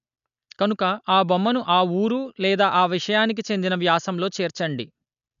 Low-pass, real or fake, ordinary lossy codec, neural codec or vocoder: 7.2 kHz; real; none; none